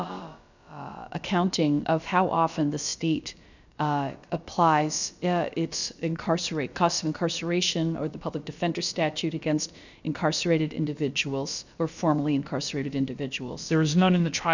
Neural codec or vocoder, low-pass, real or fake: codec, 16 kHz, about 1 kbps, DyCAST, with the encoder's durations; 7.2 kHz; fake